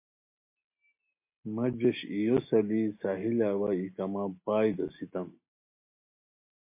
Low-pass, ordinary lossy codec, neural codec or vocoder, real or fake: 3.6 kHz; MP3, 24 kbps; none; real